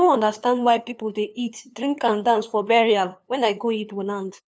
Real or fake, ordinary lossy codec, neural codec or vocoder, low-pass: fake; none; codec, 16 kHz, 2 kbps, FunCodec, trained on LibriTTS, 25 frames a second; none